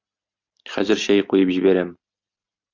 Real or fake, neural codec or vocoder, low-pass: real; none; 7.2 kHz